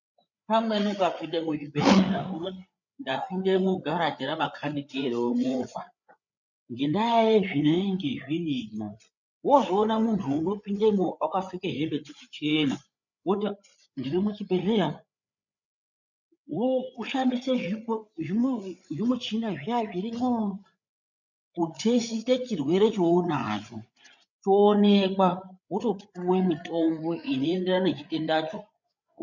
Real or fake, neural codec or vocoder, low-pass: fake; codec, 16 kHz, 8 kbps, FreqCodec, larger model; 7.2 kHz